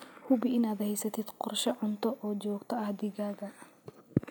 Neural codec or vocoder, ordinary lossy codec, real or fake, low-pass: none; none; real; none